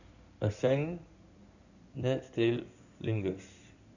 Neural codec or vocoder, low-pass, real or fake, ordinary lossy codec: codec, 16 kHz in and 24 kHz out, 2.2 kbps, FireRedTTS-2 codec; 7.2 kHz; fake; none